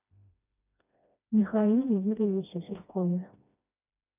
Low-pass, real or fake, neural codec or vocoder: 3.6 kHz; fake; codec, 16 kHz, 1 kbps, FreqCodec, smaller model